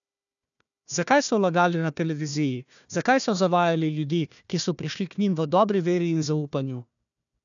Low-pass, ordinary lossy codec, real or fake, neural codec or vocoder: 7.2 kHz; none; fake; codec, 16 kHz, 1 kbps, FunCodec, trained on Chinese and English, 50 frames a second